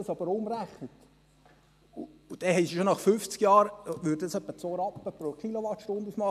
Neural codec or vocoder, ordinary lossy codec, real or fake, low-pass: none; MP3, 96 kbps; real; 14.4 kHz